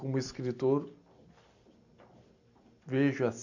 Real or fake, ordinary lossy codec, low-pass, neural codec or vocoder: real; none; 7.2 kHz; none